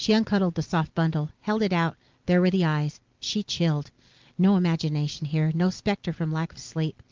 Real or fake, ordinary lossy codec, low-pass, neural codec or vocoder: real; Opus, 32 kbps; 7.2 kHz; none